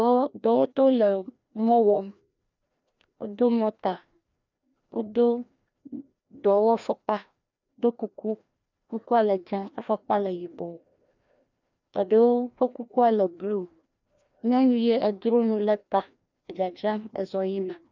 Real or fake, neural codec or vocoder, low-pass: fake; codec, 16 kHz, 1 kbps, FreqCodec, larger model; 7.2 kHz